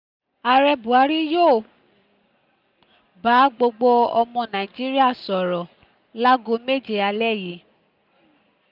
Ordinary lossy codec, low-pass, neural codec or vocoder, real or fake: none; 5.4 kHz; none; real